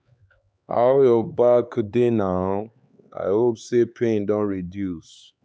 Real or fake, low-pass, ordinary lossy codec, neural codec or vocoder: fake; none; none; codec, 16 kHz, 4 kbps, X-Codec, HuBERT features, trained on LibriSpeech